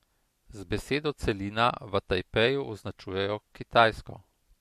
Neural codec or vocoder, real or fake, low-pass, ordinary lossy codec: none; real; 14.4 kHz; MP3, 64 kbps